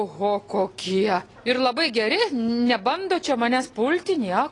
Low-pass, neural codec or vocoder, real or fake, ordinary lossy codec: 10.8 kHz; none; real; AAC, 32 kbps